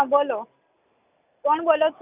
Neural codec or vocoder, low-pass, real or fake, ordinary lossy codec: none; 3.6 kHz; real; none